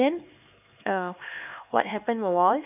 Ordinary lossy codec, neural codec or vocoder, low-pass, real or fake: none; codec, 16 kHz, 4 kbps, X-Codec, HuBERT features, trained on LibriSpeech; 3.6 kHz; fake